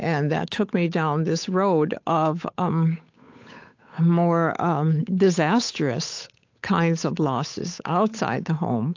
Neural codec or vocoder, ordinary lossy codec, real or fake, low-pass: codec, 16 kHz, 8 kbps, FunCodec, trained on Chinese and English, 25 frames a second; AAC, 48 kbps; fake; 7.2 kHz